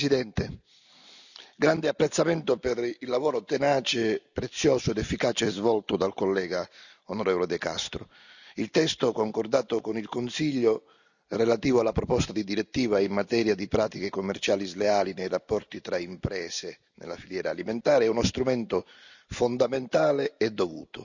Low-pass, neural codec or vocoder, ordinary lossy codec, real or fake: 7.2 kHz; none; none; real